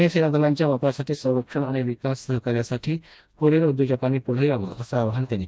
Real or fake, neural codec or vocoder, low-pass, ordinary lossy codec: fake; codec, 16 kHz, 1 kbps, FreqCodec, smaller model; none; none